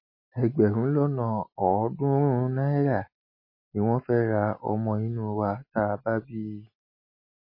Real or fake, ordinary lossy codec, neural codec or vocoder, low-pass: real; MP3, 24 kbps; none; 5.4 kHz